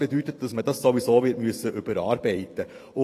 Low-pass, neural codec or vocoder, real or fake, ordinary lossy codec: 14.4 kHz; vocoder, 44.1 kHz, 128 mel bands every 512 samples, BigVGAN v2; fake; AAC, 48 kbps